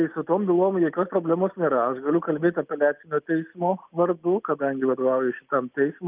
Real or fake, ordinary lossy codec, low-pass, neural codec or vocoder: real; Opus, 32 kbps; 3.6 kHz; none